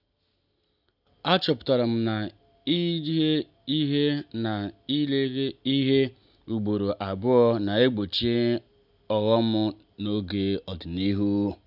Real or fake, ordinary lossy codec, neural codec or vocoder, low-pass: real; none; none; 5.4 kHz